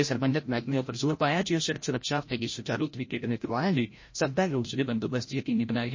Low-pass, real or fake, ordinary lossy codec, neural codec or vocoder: 7.2 kHz; fake; MP3, 32 kbps; codec, 16 kHz, 0.5 kbps, FreqCodec, larger model